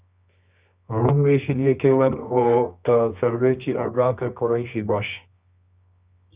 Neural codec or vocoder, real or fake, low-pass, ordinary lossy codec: codec, 24 kHz, 0.9 kbps, WavTokenizer, medium music audio release; fake; 3.6 kHz; Opus, 64 kbps